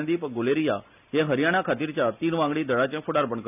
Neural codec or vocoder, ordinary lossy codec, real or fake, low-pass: none; none; real; 3.6 kHz